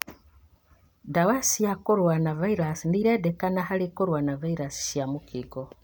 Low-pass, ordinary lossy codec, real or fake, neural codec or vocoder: none; none; real; none